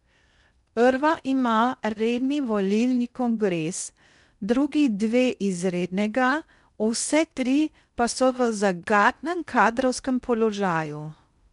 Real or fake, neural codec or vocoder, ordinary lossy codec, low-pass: fake; codec, 16 kHz in and 24 kHz out, 0.6 kbps, FocalCodec, streaming, 2048 codes; none; 10.8 kHz